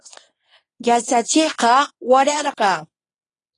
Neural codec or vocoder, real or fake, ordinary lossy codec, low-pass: codec, 24 kHz, 0.9 kbps, WavTokenizer, medium speech release version 1; fake; AAC, 32 kbps; 10.8 kHz